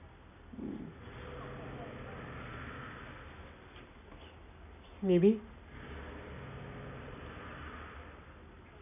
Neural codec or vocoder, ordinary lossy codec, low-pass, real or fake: none; none; 3.6 kHz; real